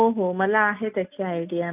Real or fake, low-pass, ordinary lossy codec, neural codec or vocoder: real; 3.6 kHz; none; none